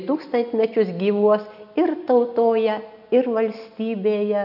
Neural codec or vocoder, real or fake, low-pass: none; real; 5.4 kHz